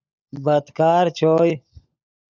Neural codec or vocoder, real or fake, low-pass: codec, 16 kHz, 16 kbps, FunCodec, trained on LibriTTS, 50 frames a second; fake; 7.2 kHz